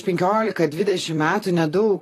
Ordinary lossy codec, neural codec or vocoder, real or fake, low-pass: AAC, 48 kbps; vocoder, 44.1 kHz, 128 mel bands, Pupu-Vocoder; fake; 14.4 kHz